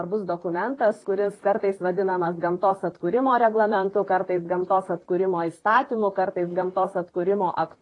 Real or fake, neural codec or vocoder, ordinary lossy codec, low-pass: fake; vocoder, 44.1 kHz, 128 mel bands, Pupu-Vocoder; AAC, 32 kbps; 10.8 kHz